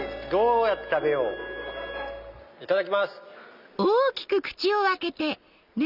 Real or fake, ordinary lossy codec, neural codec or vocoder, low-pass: real; none; none; 5.4 kHz